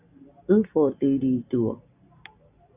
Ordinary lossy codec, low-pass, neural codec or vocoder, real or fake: Opus, 64 kbps; 3.6 kHz; none; real